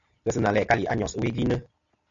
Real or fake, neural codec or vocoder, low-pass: real; none; 7.2 kHz